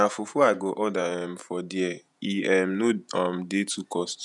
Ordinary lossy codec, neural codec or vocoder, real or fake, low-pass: none; vocoder, 48 kHz, 128 mel bands, Vocos; fake; 10.8 kHz